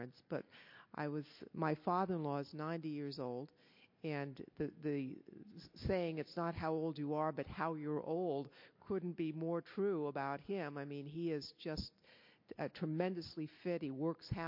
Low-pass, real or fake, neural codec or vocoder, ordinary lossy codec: 5.4 kHz; real; none; MP3, 32 kbps